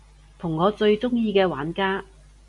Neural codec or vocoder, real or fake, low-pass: none; real; 10.8 kHz